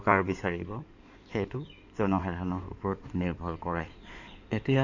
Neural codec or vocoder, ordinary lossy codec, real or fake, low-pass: codec, 16 kHz in and 24 kHz out, 2.2 kbps, FireRedTTS-2 codec; none; fake; 7.2 kHz